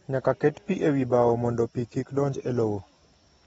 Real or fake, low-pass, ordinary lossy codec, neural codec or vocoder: real; 19.8 kHz; AAC, 24 kbps; none